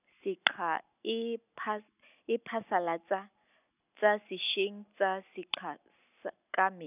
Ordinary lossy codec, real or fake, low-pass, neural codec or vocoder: none; real; 3.6 kHz; none